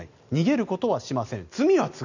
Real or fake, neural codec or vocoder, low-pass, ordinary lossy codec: real; none; 7.2 kHz; none